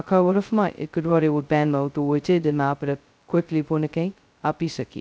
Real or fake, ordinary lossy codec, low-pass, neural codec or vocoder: fake; none; none; codec, 16 kHz, 0.2 kbps, FocalCodec